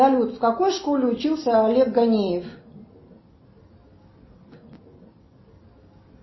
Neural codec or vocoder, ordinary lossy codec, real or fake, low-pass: none; MP3, 24 kbps; real; 7.2 kHz